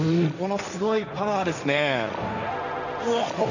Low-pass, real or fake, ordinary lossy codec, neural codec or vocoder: 7.2 kHz; fake; none; codec, 16 kHz, 1.1 kbps, Voila-Tokenizer